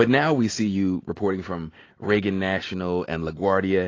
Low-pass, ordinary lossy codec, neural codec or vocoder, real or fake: 7.2 kHz; AAC, 32 kbps; none; real